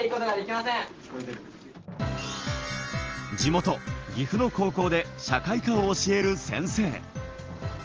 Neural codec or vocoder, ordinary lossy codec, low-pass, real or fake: none; Opus, 16 kbps; 7.2 kHz; real